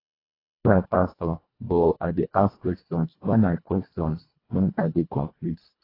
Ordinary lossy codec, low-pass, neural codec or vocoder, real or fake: AAC, 24 kbps; 5.4 kHz; codec, 24 kHz, 1.5 kbps, HILCodec; fake